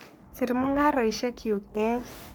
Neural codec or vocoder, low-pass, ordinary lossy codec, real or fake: codec, 44.1 kHz, 3.4 kbps, Pupu-Codec; none; none; fake